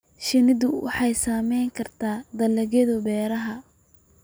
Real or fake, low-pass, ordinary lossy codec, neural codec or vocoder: real; none; none; none